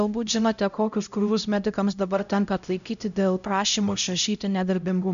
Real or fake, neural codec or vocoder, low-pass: fake; codec, 16 kHz, 0.5 kbps, X-Codec, HuBERT features, trained on LibriSpeech; 7.2 kHz